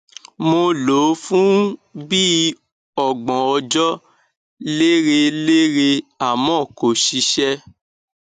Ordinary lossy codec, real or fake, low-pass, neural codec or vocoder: AAC, 96 kbps; real; 10.8 kHz; none